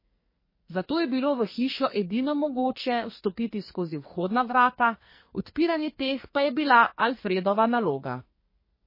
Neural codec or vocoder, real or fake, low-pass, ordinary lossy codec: codec, 44.1 kHz, 2.6 kbps, SNAC; fake; 5.4 kHz; MP3, 24 kbps